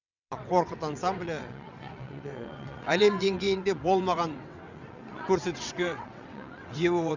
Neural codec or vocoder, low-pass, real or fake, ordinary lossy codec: vocoder, 22.05 kHz, 80 mel bands, WaveNeXt; 7.2 kHz; fake; none